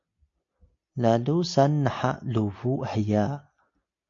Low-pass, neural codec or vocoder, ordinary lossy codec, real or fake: 7.2 kHz; none; AAC, 48 kbps; real